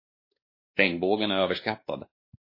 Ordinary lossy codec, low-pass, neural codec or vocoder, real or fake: MP3, 24 kbps; 5.4 kHz; codec, 16 kHz, 2 kbps, X-Codec, WavLM features, trained on Multilingual LibriSpeech; fake